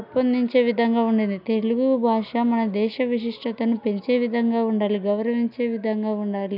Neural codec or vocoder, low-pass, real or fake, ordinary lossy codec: none; 5.4 kHz; real; none